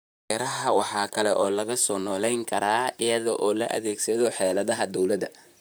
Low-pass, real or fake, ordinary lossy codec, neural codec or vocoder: none; fake; none; vocoder, 44.1 kHz, 128 mel bands, Pupu-Vocoder